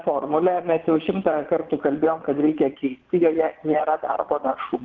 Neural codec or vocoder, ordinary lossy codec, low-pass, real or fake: vocoder, 22.05 kHz, 80 mel bands, Vocos; Opus, 16 kbps; 7.2 kHz; fake